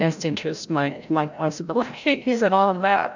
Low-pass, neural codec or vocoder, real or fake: 7.2 kHz; codec, 16 kHz, 0.5 kbps, FreqCodec, larger model; fake